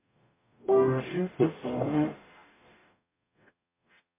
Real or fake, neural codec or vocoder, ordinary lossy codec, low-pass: fake; codec, 44.1 kHz, 0.9 kbps, DAC; MP3, 24 kbps; 3.6 kHz